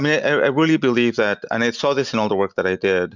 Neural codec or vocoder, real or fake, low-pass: none; real; 7.2 kHz